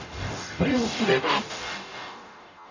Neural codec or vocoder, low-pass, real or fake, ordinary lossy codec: codec, 44.1 kHz, 0.9 kbps, DAC; 7.2 kHz; fake; none